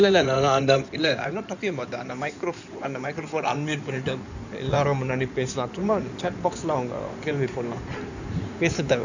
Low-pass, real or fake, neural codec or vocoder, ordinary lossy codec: 7.2 kHz; fake; codec, 16 kHz in and 24 kHz out, 2.2 kbps, FireRedTTS-2 codec; none